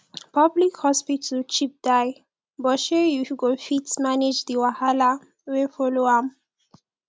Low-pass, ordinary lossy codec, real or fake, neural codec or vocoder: none; none; real; none